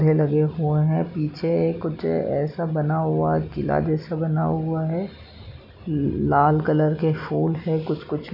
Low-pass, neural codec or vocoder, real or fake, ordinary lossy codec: 5.4 kHz; none; real; none